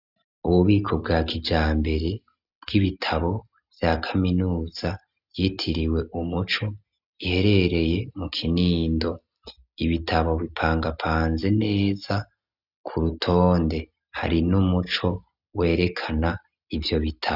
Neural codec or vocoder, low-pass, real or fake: none; 5.4 kHz; real